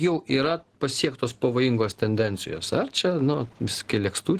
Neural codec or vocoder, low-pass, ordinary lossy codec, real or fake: none; 14.4 kHz; Opus, 16 kbps; real